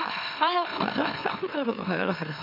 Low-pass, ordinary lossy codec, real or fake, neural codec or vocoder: 5.4 kHz; MP3, 32 kbps; fake; autoencoder, 44.1 kHz, a latent of 192 numbers a frame, MeloTTS